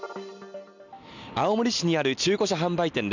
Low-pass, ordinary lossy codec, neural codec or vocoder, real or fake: 7.2 kHz; none; none; real